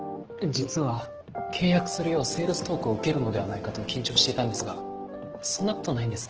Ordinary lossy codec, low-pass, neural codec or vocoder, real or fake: Opus, 16 kbps; 7.2 kHz; none; real